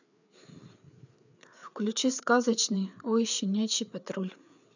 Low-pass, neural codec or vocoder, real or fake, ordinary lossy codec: 7.2 kHz; codec, 16 kHz, 4 kbps, FreqCodec, larger model; fake; none